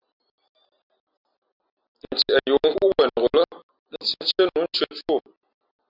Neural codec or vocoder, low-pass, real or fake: none; 5.4 kHz; real